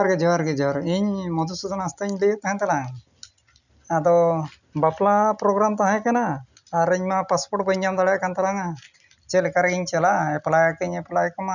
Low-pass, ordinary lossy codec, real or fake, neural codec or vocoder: 7.2 kHz; none; real; none